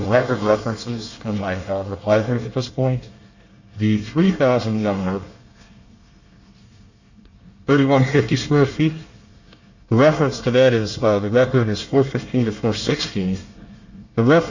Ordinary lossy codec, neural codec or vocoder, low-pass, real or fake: Opus, 64 kbps; codec, 24 kHz, 1 kbps, SNAC; 7.2 kHz; fake